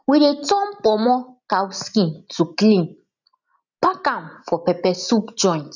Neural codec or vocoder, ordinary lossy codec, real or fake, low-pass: none; none; real; 7.2 kHz